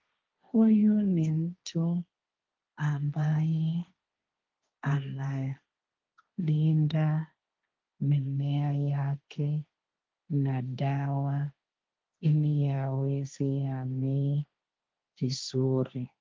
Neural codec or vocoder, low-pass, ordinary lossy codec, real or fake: codec, 16 kHz, 1.1 kbps, Voila-Tokenizer; 7.2 kHz; Opus, 32 kbps; fake